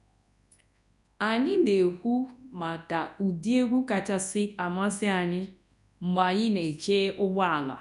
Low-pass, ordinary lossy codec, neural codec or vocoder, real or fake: 10.8 kHz; none; codec, 24 kHz, 0.9 kbps, WavTokenizer, large speech release; fake